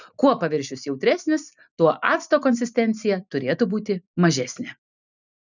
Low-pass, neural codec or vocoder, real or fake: 7.2 kHz; none; real